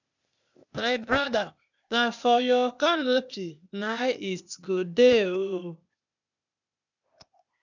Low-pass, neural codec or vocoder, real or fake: 7.2 kHz; codec, 16 kHz, 0.8 kbps, ZipCodec; fake